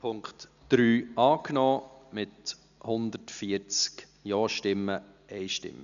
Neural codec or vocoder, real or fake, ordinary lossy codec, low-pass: none; real; none; 7.2 kHz